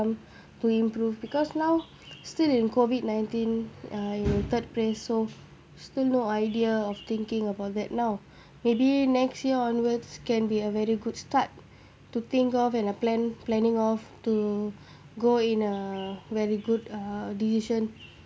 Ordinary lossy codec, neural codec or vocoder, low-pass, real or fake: none; none; none; real